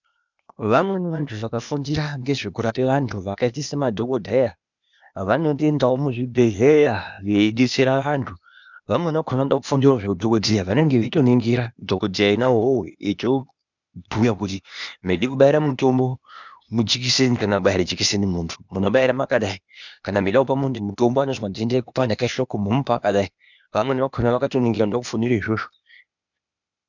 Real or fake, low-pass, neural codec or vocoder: fake; 7.2 kHz; codec, 16 kHz, 0.8 kbps, ZipCodec